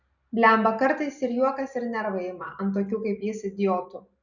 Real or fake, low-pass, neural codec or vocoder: real; 7.2 kHz; none